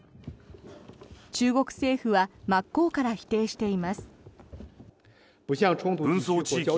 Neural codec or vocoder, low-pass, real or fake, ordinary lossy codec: none; none; real; none